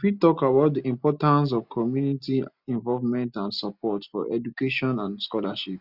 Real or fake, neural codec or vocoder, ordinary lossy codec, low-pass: real; none; Opus, 64 kbps; 5.4 kHz